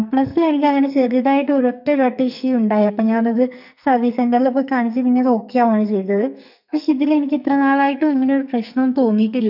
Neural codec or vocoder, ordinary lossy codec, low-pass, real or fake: codec, 44.1 kHz, 2.6 kbps, SNAC; none; 5.4 kHz; fake